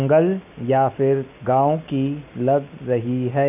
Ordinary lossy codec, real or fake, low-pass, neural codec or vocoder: none; real; 3.6 kHz; none